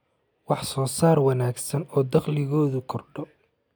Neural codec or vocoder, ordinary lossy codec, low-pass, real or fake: none; none; none; real